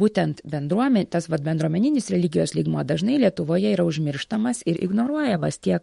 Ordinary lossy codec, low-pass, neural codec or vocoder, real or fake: MP3, 48 kbps; 19.8 kHz; autoencoder, 48 kHz, 128 numbers a frame, DAC-VAE, trained on Japanese speech; fake